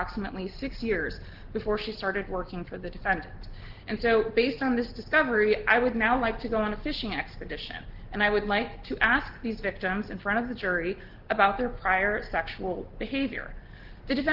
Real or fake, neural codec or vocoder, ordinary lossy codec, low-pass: real; none; Opus, 16 kbps; 5.4 kHz